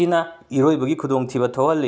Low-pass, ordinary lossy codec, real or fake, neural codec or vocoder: none; none; real; none